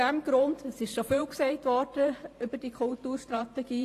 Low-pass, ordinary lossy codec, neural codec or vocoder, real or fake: 14.4 kHz; AAC, 48 kbps; vocoder, 44.1 kHz, 128 mel bands every 512 samples, BigVGAN v2; fake